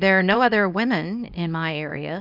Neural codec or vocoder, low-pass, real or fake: codec, 24 kHz, 0.9 kbps, WavTokenizer, small release; 5.4 kHz; fake